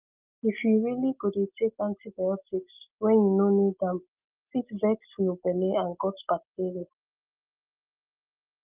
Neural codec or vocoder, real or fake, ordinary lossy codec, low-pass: none; real; Opus, 32 kbps; 3.6 kHz